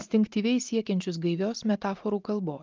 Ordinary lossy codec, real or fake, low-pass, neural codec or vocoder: Opus, 24 kbps; real; 7.2 kHz; none